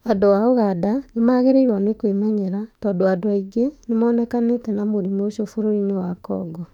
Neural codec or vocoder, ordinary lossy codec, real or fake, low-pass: autoencoder, 48 kHz, 32 numbers a frame, DAC-VAE, trained on Japanese speech; none; fake; 19.8 kHz